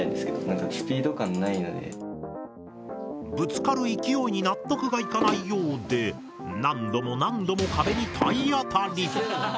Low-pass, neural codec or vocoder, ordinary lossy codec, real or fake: none; none; none; real